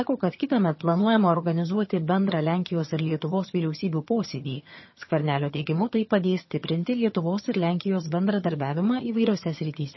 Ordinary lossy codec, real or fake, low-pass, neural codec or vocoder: MP3, 24 kbps; fake; 7.2 kHz; vocoder, 22.05 kHz, 80 mel bands, HiFi-GAN